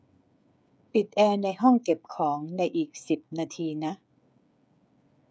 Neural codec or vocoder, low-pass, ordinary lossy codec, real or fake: codec, 16 kHz, 16 kbps, FreqCodec, smaller model; none; none; fake